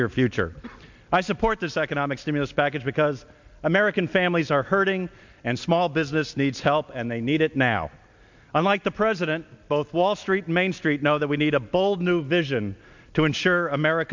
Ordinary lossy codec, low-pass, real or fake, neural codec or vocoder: MP3, 64 kbps; 7.2 kHz; real; none